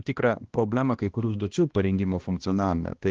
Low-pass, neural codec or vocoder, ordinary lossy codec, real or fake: 7.2 kHz; codec, 16 kHz, 1 kbps, X-Codec, HuBERT features, trained on balanced general audio; Opus, 16 kbps; fake